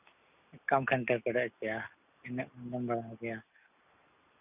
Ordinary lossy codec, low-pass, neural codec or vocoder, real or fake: none; 3.6 kHz; none; real